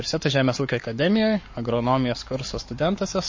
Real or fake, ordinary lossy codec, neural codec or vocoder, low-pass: fake; MP3, 32 kbps; codec, 44.1 kHz, 7.8 kbps, Pupu-Codec; 7.2 kHz